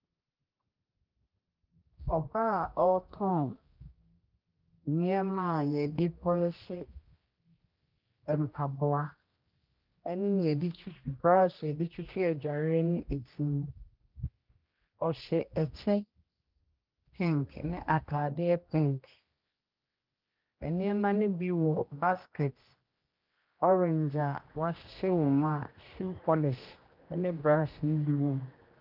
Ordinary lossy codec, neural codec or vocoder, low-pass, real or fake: Opus, 32 kbps; codec, 16 kHz, 1 kbps, X-Codec, HuBERT features, trained on general audio; 5.4 kHz; fake